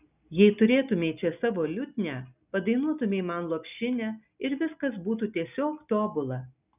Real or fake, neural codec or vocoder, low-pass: real; none; 3.6 kHz